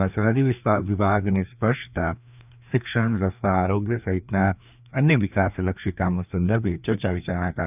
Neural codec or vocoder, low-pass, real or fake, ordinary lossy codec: codec, 16 kHz, 2 kbps, FreqCodec, larger model; 3.6 kHz; fake; none